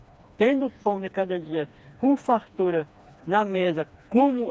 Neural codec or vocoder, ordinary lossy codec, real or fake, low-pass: codec, 16 kHz, 2 kbps, FreqCodec, smaller model; none; fake; none